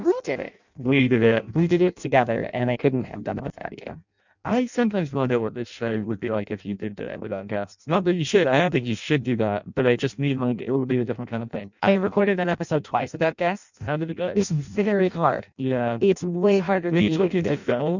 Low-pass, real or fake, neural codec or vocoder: 7.2 kHz; fake; codec, 16 kHz in and 24 kHz out, 0.6 kbps, FireRedTTS-2 codec